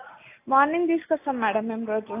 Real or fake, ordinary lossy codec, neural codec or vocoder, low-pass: real; AAC, 24 kbps; none; 3.6 kHz